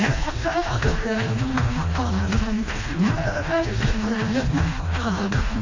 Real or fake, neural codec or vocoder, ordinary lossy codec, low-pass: fake; codec, 16 kHz, 1 kbps, FreqCodec, smaller model; AAC, 32 kbps; 7.2 kHz